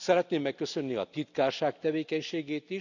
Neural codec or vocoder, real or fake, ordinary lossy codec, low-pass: none; real; none; 7.2 kHz